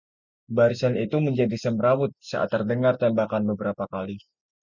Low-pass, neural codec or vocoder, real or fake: 7.2 kHz; none; real